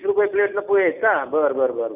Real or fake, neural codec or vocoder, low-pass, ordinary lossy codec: real; none; 3.6 kHz; none